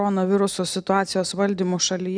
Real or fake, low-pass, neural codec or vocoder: real; 9.9 kHz; none